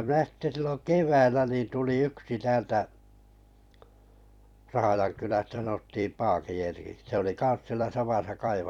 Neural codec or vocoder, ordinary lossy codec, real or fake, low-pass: vocoder, 44.1 kHz, 128 mel bands every 256 samples, BigVGAN v2; none; fake; 19.8 kHz